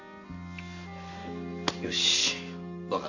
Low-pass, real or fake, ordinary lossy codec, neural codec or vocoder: 7.2 kHz; real; none; none